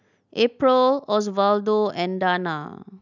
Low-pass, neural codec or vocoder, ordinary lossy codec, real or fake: 7.2 kHz; none; none; real